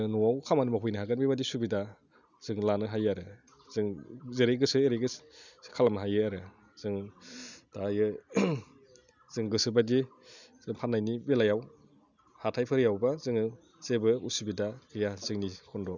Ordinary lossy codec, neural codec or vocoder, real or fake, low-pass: none; none; real; 7.2 kHz